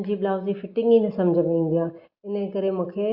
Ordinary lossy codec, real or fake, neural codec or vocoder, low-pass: Opus, 64 kbps; real; none; 5.4 kHz